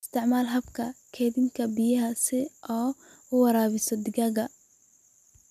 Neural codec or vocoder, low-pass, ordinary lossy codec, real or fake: none; 14.4 kHz; none; real